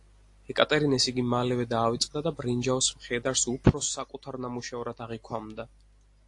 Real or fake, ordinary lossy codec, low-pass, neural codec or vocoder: real; AAC, 48 kbps; 10.8 kHz; none